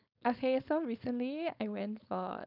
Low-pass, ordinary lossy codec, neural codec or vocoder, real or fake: 5.4 kHz; none; codec, 16 kHz, 4.8 kbps, FACodec; fake